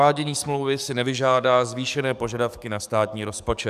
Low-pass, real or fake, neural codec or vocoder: 14.4 kHz; fake; codec, 44.1 kHz, 7.8 kbps, DAC